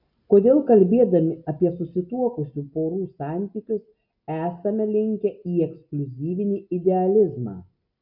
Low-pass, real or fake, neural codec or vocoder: 5.4 kHz; real; none